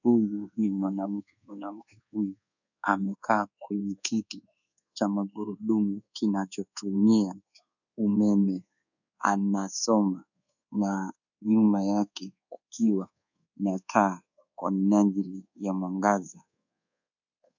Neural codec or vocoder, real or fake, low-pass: codec, 24 kHz, 1.2 kbps, DualCodec; fake; 7.2 kHz